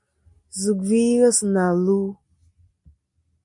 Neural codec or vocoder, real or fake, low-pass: none; real; 10.8 kHz